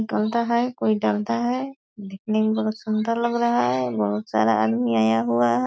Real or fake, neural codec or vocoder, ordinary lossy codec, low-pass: real; none; none; none